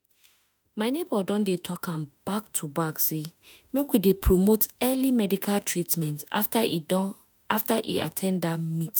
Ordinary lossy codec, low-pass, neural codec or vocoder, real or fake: none; none; autoencoder, 48 kHz, 32 numbers a frame, DAC-VAE, trained on Japanese speech; fake